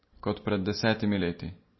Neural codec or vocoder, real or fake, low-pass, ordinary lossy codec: none; real; 7.2 kHz; MP3, 24 kbps